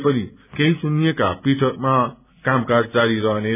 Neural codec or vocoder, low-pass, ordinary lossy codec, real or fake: none; 3.6 kHz; none; real